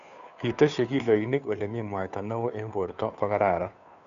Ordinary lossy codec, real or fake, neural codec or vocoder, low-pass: MP3, 96 kbps; fake; codec, 16 kHz, 2 kbps, FunCodec, trained on Chinese and English, 25 frames a second; 7.2 kHz